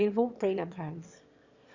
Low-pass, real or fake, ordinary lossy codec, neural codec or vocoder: 7.2 kHz; fake; Opus, 64 kbps; autoencoder, 22.05 kHz, a latent of 192 numbers a frame, VITS, trained on one speaker